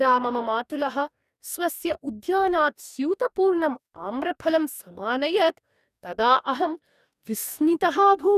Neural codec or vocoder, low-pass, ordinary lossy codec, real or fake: codec, 44.1 kHz, 2.6 kbps, DAC; 14.4 kHz; none; fake